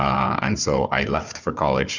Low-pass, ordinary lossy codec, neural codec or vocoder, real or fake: 7.2 kHz; Opus, 64 kbps; codec, 16 kHz, 4 kbps, FunCodec, trained on Chinese and English, 50 frames a second; fake